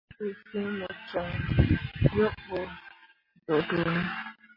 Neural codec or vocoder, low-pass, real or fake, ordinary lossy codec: none; 5.4 kHz; real; MP3, 24 kbps